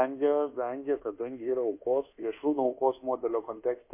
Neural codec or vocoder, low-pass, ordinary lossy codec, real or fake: codec, 24 kHz, 1.2 kbps, DualCodec; 3.6 kHz; MP3, 24 kbps; fake